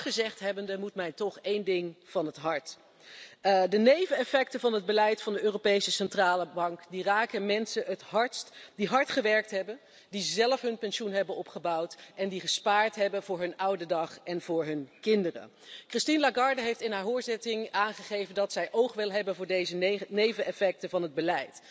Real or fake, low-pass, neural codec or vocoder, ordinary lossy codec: real; none; none; none